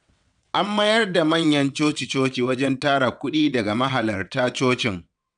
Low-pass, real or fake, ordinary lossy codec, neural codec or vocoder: 9.9 kHz; fake; none; vocoder, 22.05 kHz, 80 mel bands, WaveNeXt